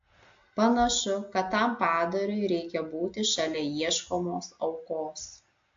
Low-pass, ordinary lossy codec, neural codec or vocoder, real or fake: 7.2 kHz; AAC, 48 kbps; none; real